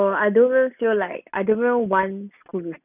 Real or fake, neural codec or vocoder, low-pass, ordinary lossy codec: real; none; 3.6 kHz; none